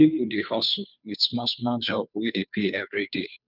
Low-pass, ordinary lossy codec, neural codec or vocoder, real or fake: 5.4 kHz; none; codec, 16 kHz, 2 kbps, FunCodec, trained on Chinese and English, 25 frames a second; fake